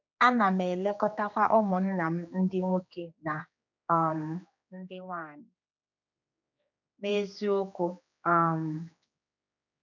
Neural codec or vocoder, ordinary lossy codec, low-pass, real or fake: codec, 16 kHz, 2 kbps, X-Codec, HuBERT features, trained on general audio; none; 7.2 kHz; fake